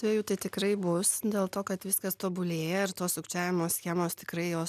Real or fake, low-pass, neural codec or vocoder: real; 14.4 kHz; none